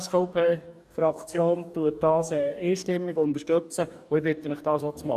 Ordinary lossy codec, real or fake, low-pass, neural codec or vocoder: none; fake; 14.4 kHz; codec, 44.1 kHz, 2.6 kbps, DAC